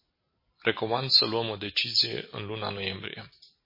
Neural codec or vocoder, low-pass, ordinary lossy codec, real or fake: none; 5.4 kHz; MP3, 24 kbps; real